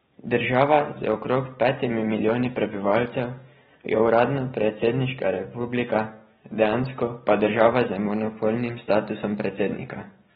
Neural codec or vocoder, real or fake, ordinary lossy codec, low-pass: none; real; AAC, 16 kbps; 19.8 kHz